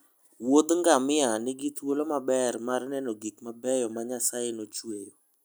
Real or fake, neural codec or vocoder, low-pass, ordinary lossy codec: real; none; none; none